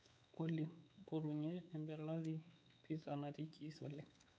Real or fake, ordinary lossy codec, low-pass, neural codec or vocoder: fake; none; none; codec, 16 kHz, 4 kbps, X-Codec, WavLM features, trained on Multilingual LibriSpeech